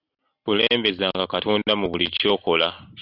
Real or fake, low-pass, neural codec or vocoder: real; 5.4 kHz; none